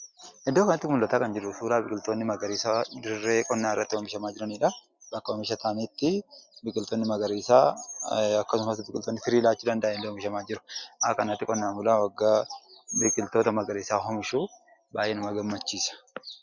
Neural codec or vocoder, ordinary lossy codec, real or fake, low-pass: none; Opus, 64 kbps; real; 7.2 kHz